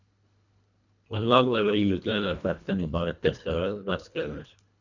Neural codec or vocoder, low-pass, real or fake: codec, 24 kHz, 1.5 kbps, HILCodec; 7.2 kHz; fake